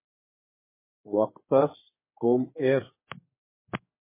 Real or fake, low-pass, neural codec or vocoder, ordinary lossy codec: fake; 3.6 kHz; codec, 16 kHz, 4 kbps, X-Codec, HuBERT features, trained on general audio; MP3, 16 kbps